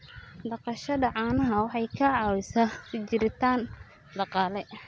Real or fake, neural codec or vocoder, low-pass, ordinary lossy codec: real; none; none; none